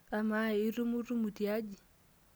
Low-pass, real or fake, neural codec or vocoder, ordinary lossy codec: none; real; none; none